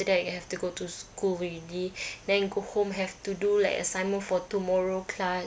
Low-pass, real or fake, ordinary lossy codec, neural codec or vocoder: none; real; none; none